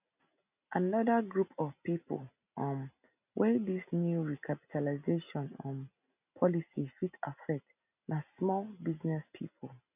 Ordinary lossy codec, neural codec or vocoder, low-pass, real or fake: none; none; 3.6 kHz; real